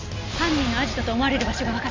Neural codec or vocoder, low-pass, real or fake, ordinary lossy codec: none; 7.2 kHz; real; MP3, 64 kbps